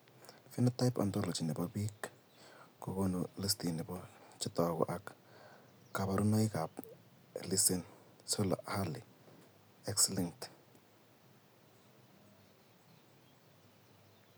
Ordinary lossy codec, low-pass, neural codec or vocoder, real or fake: none; none; none; real